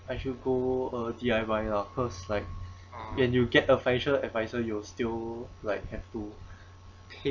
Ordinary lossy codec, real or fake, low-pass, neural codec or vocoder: none; real; 7.2 kHz; none